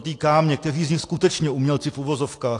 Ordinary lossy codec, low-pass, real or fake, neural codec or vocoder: AAC, 48 kbps; 10.8 kHz; real; none